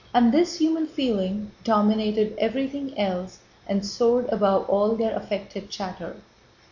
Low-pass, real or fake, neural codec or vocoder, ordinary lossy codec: 7.2 kHz; real; none; MP3, 48 kbps